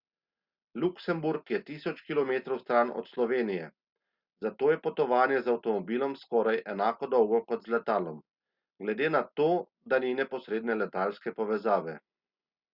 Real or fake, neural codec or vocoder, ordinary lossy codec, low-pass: real; none; Opus, 64 kbps; 5.4 kHz